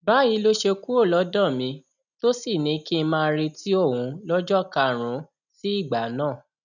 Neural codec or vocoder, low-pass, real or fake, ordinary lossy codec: none; 7.2 kHz; real; none